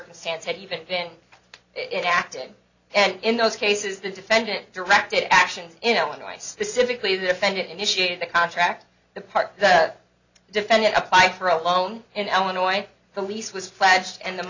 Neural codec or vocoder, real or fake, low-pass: none; real; 7.2 kHz